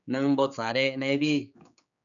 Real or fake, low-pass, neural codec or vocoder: fake; 7.2 kHz; codec, 16 kHz, 4 kbps, X-Codec, HuBERT features, trained on general audio